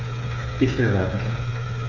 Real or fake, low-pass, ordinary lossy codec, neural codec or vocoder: fake; 7.2 kHz; none; codec, 16 kHz, 8 kbps, FreqCodec, smaller model